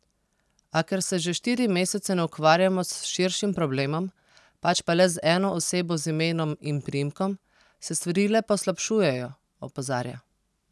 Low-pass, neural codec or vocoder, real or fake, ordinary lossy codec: none; none; real; none